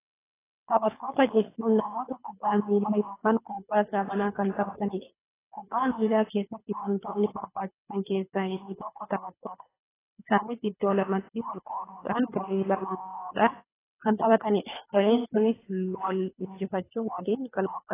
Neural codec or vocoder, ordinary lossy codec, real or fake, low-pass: codec, 24 kHz, 3 kbps, HILCodec; AAC, 16 kbps; fake; 3.6 kHz